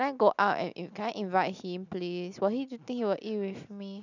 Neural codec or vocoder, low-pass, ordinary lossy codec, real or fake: none; 7.2 kHz; none; real